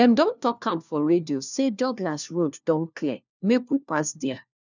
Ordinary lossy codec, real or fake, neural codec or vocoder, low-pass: none; fake; codec, 16 kHz, 1 kbps, FunCodec, trained on LibriTTS, 50 frames a second; 7.2 kHz